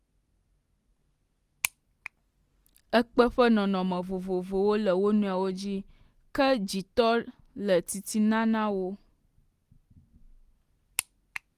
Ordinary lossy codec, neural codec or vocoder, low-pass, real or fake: Opus, 24 kbps; none; 14.4 kHz; real